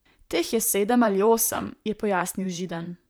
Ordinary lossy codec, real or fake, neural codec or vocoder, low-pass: none; fake; vocoder, 44.1 kHz, 128 mel bands, Pupu-Vocoder; none